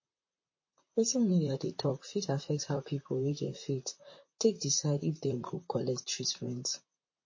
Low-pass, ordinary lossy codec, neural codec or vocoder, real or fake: 7.2 kHz; MP3, 32 kbps; vocoder, 44.1 kHz, 128 mel bands, Pupu-Vocoder; fake